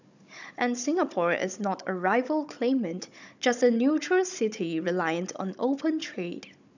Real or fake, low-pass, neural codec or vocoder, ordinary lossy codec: fake; 7.2 kHz; codec, 16 kHz, 16 kbps, FunCodec, trained on Chinese and English, 50 frames a second; none